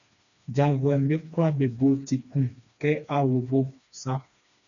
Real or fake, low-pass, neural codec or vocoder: fake; 7.2 kHz; codec, 16 kHz, 2 kbps, FreqCodec, smaller model